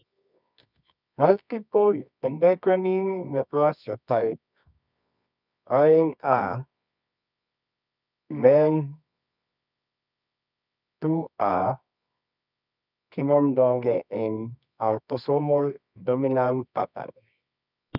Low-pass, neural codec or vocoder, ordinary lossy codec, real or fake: 5.4 kHz; codec, 24 kHz, 0.9 kbps, WavTokenizer, medium music audio release; none; fake